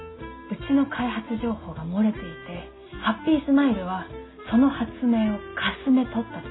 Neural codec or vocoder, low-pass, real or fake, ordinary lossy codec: none; 7.2 kHz; real; AAC, 16 kbps